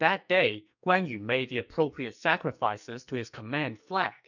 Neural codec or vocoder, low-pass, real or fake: codec, 44.1 kHz, 2.6 kbps, SNAC; 7.2 kHz; fake